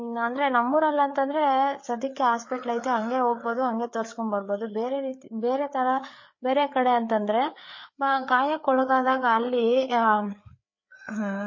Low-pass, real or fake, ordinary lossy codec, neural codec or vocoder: 7.2 kHz; fake; MP3, 32 kbps; codec, 16 kHz, 4 kbps, FreqCodec, larger model